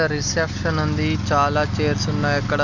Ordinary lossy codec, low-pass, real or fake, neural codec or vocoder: none; 7.2 kHz; real; none